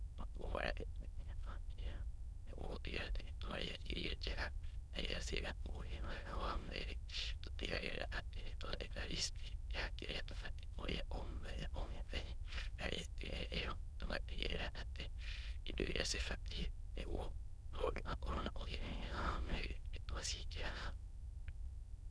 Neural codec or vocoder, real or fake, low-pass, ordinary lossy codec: autoencoder, 22.05 kHz, a latent of 192 numbers a frame, VITS, trained on many speakers; fake; none; none